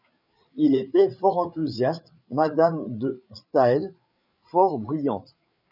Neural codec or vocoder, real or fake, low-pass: codec, 16 kHz, 8 kbps, FreqCodec, larger model; fake; 5.4 kHz